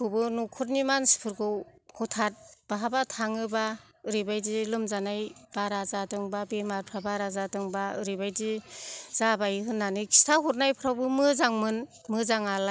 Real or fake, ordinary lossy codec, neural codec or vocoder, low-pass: real; none; none; none